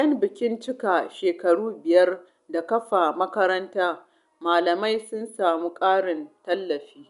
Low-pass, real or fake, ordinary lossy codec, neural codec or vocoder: 10.8 kHz; real; none; none